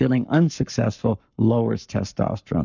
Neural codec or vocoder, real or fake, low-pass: codec, 44.1 kHz, 7.8 kbps, Pupu-Codec; fake; 7.2 kHz